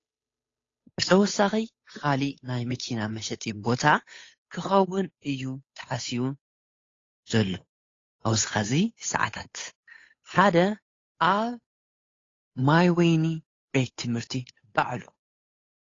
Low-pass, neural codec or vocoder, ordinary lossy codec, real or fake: 7.2 kHz; codec, 16 kHz, 8 kbps, FunCodec, trained on Chinese and English, 25 frames a second; AAC, 32 kbps; fake